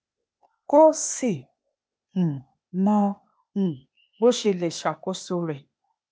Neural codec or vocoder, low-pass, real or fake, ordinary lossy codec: codec, 16 kHz, 0.8 kbps, ZipCodec; none; fake; none